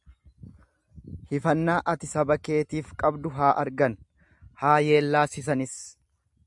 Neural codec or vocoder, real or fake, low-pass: none; real; 10.8 kHz